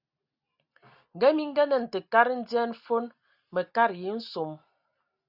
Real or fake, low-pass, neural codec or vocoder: real; 5.4 kHz; none